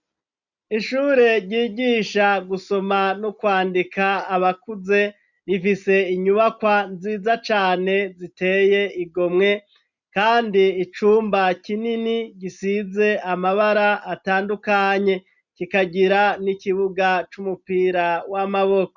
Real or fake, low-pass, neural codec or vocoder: real; 7.2 kHz; none